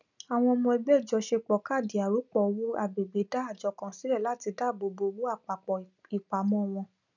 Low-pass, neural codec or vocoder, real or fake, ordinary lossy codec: 7.2 kHz; none; real; none